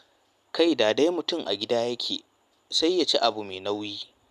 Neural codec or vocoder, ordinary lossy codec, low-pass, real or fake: none; none; 14.4 kHz; real